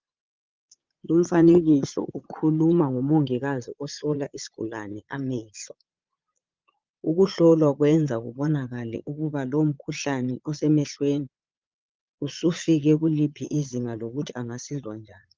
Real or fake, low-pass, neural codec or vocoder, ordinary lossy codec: fake; 7.2 kHz; vocoder, 22.05 kHz, 80 mel bands, Vocos; Opus, 24 kbps